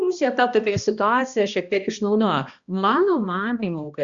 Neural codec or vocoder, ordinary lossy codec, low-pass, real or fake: codec, 16 kHz, 1 kbps, X-Codec, HuBERT features, trained on balanced general audio; Opus, 64 kbps; 7.2 kHz; fake